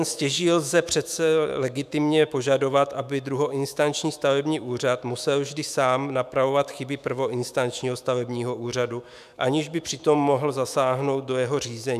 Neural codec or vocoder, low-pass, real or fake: autoencoder, 48 kHz, 128 numbers a frame, DAC-VAE, trained on Japanese speech; 14.4 kHz; fake